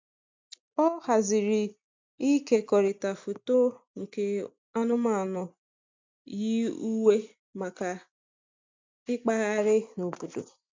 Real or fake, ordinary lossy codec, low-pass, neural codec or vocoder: real; MP3, 64 kbps; 7.2 kHz; none